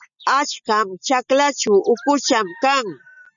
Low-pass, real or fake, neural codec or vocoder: 7.2 kHz; real; none